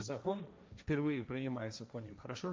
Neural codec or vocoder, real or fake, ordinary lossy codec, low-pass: codec, 16 kHz, 1.1 kbps, Voila-Tokenizer; fake; none; none